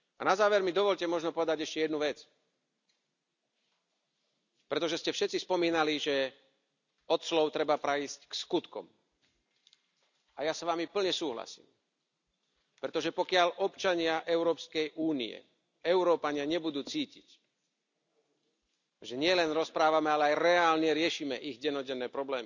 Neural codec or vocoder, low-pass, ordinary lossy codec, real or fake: none; 7.2 kHz; none; real